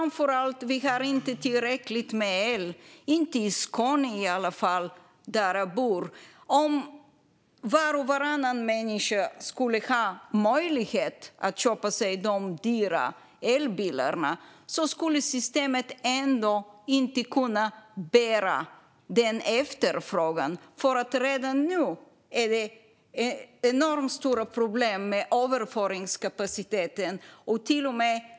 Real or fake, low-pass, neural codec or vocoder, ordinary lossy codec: real; none; none; none